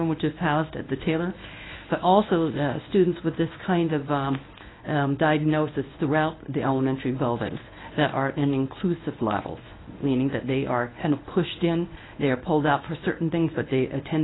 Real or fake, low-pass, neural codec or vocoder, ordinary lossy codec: fake; 7.2 kHz; codec, 24 kHz, 0.9 kbps, WavTokenizer, medium speech release version 1; AAC, 16 kbps